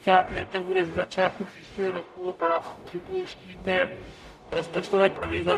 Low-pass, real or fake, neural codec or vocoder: 14.4 kHz; fake; codec, 44.1 kHz, 0.9 kbps, DAC